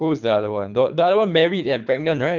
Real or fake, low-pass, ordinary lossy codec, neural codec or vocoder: fake; 7.2 kHz; none; codec, 24 kHz, 3 kbps, HILCodec